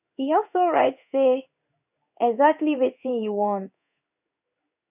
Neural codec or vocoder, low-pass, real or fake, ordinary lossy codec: codec, 16 kHz in and 24 kHz out, 1 kbps, XY-Tokenizer; 3.6 kHz; fake; none